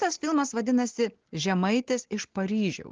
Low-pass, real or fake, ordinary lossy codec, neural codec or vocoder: 7.2 kHz; real; Opus, 16 kbps; none